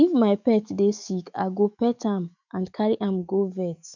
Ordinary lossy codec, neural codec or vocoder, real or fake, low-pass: none; autoencoder, 48 kHz, 128 numbers a frame, DAC-VAE, trained on Japanese speech; fake; 7.2 kHz